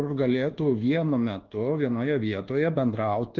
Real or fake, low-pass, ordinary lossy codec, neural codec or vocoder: fake; 7.2 kHz; Opus, 16 kbps; codec, 24 kHz, 1.2 kbps, DualCodec